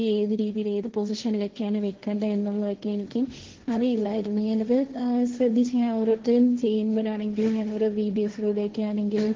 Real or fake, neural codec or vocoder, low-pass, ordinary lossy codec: fake; codec, 16 kHz, 1.1 kbps, Voila-Tokenizer; 7.2 kHz; Opus, 16 kbps